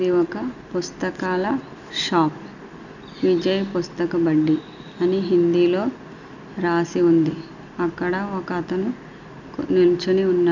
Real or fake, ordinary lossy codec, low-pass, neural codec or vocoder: real; none; 7.2 kHz; none